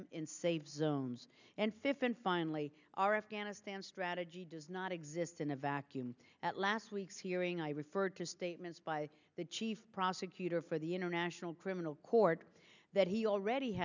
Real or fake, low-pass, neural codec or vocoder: real; 7.2 kHz; none